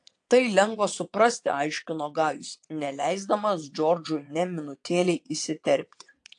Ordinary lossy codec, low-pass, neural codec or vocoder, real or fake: AAC, 64 kbps; 9.9 kHz; vocoder, 22.05 kHz, 80 mel bands, WaveNeXt; fake